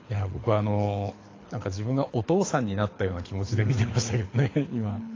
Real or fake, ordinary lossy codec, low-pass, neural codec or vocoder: fake; AAC, 32 kbps; 7.2 kHz; codec, 24 kHz, 6 kbps, HILCodec